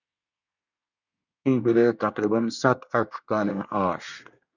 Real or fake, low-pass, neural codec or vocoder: fake; 7.2 kHz; codec, 24 kHz, 1 kbps, SNAC